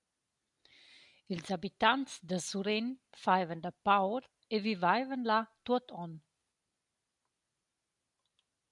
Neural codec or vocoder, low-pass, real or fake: none; 10.8 kHz; real